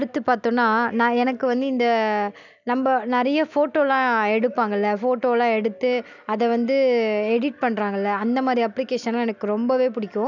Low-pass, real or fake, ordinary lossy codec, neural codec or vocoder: 7.2 kHz; real; none; none